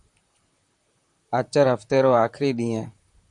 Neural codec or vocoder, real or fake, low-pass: vocoder, 44.1 kHz, 128 mel bands, Pupu-Vocoder; fake; 10.8 kHz